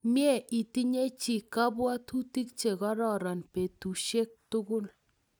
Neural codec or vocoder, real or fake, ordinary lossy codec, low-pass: none; real; none; none